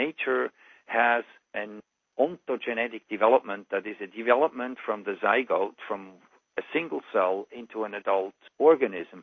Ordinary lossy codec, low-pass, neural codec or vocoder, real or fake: MP3, 32 kbps; 7.2 kHz; none; real